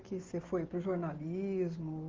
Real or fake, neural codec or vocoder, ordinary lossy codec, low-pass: real; none; Opus, 16 kbps; 7.2 kHz